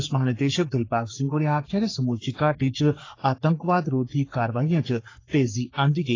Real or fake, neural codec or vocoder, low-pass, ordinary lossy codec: fake; codec, 44.1 kHz, 3.4 kbps, Pupu-Codec; 7.2 kHz; AAC, 32 kbps